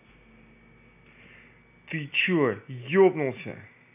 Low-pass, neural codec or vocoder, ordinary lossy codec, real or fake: 3.6 kHz; none; none; real